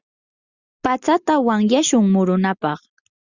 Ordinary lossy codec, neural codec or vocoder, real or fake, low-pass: Opus, 64 kbps; none; real; 7.2 kHz